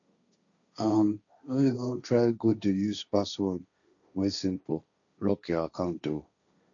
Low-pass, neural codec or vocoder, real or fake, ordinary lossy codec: 7.2 kHz; codec, 16 kHz, 1.1 kbps, Voila-Tokenizer; fake; none